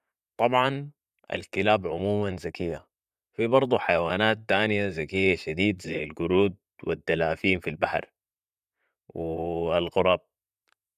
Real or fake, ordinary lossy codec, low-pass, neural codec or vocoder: fake; none; 14.4 kHz; vocoder, 44.1 kHz, 128 mel bands, Pupu-Vocoder